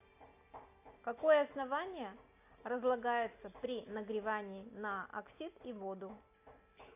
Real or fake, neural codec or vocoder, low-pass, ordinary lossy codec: real; none; 3.6 kHz; AAC, 32 kbps